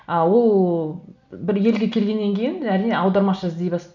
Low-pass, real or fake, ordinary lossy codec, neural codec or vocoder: 7.2 kHz; real; none; none